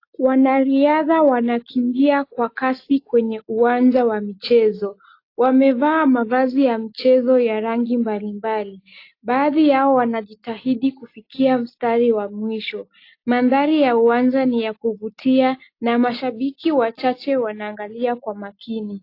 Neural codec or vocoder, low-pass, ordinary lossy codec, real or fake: none; 5.4 kHz; AAC, 32 kbps; real